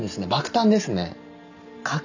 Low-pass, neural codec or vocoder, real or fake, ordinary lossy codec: 7.2 kHz; none; real; none